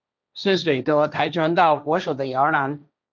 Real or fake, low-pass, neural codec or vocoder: fake; 7.2 kHz; codec, 16 kHz, 1.1 kbps, Voila-Tokenizer